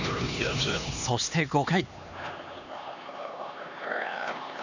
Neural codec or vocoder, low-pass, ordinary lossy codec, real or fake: codec, 16 kHz, 2 kbps, X-Codec, HuBERT features, trained on LibriSpeech; 7.2 kHz; none; fake